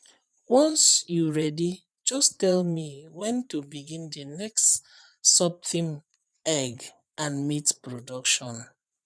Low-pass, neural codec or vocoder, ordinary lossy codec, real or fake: none; vocoder, 22.05 kHz, 80 mel bands, Vocos; none; fake